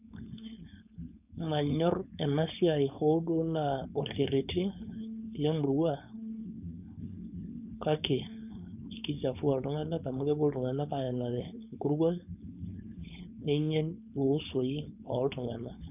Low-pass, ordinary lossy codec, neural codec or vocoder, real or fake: 3.6 kHz; none; codec, 16 kHz, 4.8 kbps, FACodec; fake